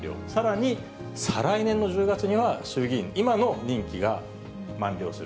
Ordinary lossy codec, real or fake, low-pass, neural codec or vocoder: none; real; none; none